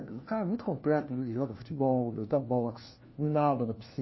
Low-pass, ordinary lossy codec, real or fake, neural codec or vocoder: 7.2 kHz; MP3, 24 kbps; fake; codec, 16 kHz, 1 kbps, FunCodec, trained on LibriTTS, 50 frames a second